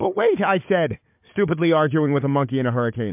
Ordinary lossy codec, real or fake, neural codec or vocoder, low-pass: MP3, 32 kbps; fake; codec, 16 kHz, 4 kbps, FunCodec, trained on Chinese and English, 50 frames a second; 3.6 kHz